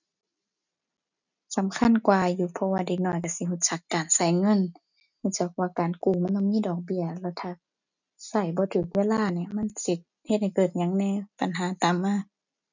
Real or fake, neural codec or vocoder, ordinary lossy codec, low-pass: real; none; none; 7.2 kHz